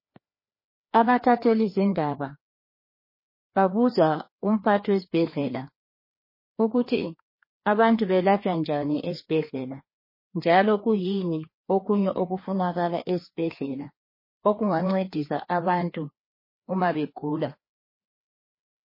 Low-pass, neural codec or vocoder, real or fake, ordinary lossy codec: 5.4 kHz; codec, 16 kHz, 2 kbps, FreqCodec, larger model; fake; MP3, 24 kbps